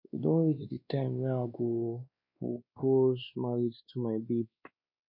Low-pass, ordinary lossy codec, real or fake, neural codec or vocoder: 5.4 kHz; MP3, 32 kbps; fake; codec, 16 kHz, 2 kbps, X-Codec, WavLM features, trained on Multilingual LibriSpeech